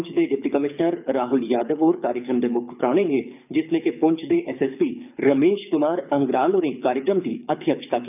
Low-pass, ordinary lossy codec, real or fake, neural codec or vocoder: 3.6 kHz; none; fake; codec, 24 kHz, 6 kbps, HILCodec